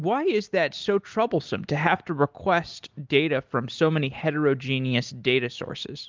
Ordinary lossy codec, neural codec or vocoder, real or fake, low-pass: Opus, 24 kbps; none; real; 7.2 kHz